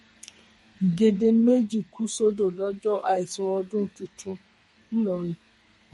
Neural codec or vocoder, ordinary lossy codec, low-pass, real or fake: codec, 32 kHz, 1.9 kbps, SNAC; MP3, 48 kbps; 14.4 kHz; fake